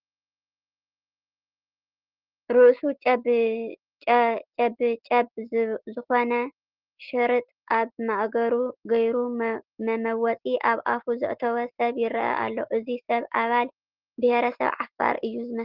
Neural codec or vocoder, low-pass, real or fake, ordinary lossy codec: none; 5.4 kHz; real; Opus, 16 kbps